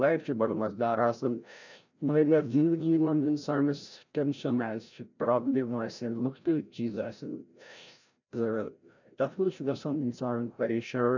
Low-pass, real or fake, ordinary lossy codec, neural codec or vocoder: 7.2 kHz; fake; none; codec, 16 kHz, 0.5 kbps, FreqCodec, larger model